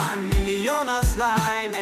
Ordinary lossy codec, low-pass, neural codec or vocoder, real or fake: MP3, 96 kbps; 14.4 kHz; autoencoder, 48 kHz, 32 numbers a frame, DAC-VAE, trained on Japanese speech; fake